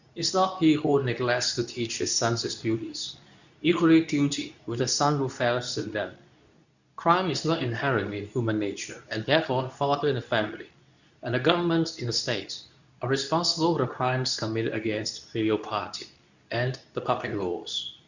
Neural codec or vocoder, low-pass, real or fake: codec, 24 kHz, 0.9 kbps, WavTokenizer, medium speech release version 2; 7.2 kHz; fake